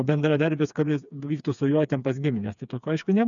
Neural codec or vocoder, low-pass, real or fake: codec, 16 kHz, 4 kbps, FreqCodec, smaller model; 7.2 kHz; fake